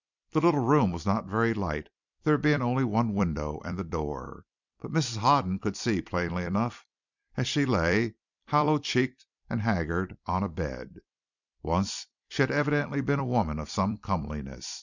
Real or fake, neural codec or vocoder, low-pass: fake; vocoder, 44.1 kHz, 128 mel bands every 256 samples, BigVGAN v2; 7.2 kHz